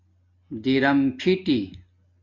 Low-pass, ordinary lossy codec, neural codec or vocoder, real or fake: 7.2 kHz; MP3, 48 kbps; none; real